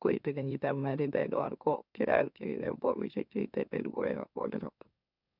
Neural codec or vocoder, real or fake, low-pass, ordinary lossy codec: autoencoder, 44.1 kHz, a latent of 192 numbers a frame, MeloTTS; fake; 5.4 kHz; none